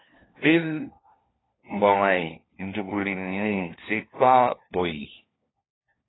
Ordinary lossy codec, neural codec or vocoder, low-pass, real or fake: AAC, 16 kbps; codec, 16 kHz, 1 kbps, FunCodec, trained on LibriTTS, 50 frames a second; 7.2 kHz; fake